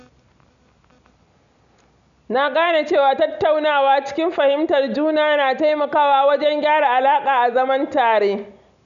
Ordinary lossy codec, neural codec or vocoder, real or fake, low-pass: none; none; real; 7.2 kHz